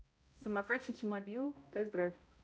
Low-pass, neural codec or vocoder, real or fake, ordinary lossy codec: none; codec, 16 kHz, 0.5 kbps, X-Codec, HuBERT features, trained on balanced general audio; fake; none